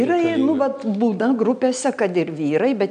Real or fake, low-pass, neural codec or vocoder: real; 9.9 kHz; none